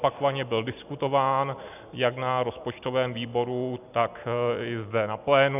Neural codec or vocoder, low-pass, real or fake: none; 3.6 kHz; real